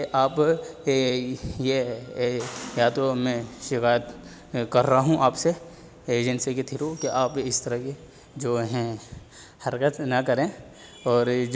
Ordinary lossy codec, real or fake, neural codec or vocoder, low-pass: none; real; none; none